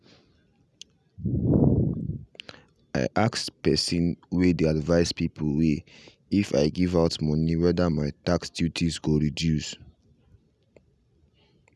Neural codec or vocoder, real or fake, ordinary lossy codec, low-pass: none; real; none; none